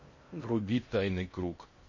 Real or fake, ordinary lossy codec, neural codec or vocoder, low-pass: fake; MP3, 32 kbps; codec, 16 kHz in and 24 kHz out, 0.6 kbps, FocalCodec, streaming, 2048 codes; 7.2 kHz